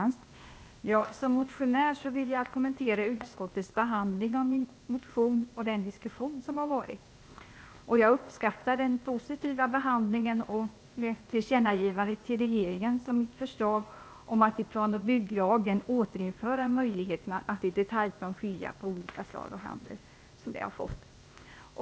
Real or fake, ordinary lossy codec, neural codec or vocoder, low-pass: fake; none; codec, 16 kHz, 0.8 kbps, ZipCodec; none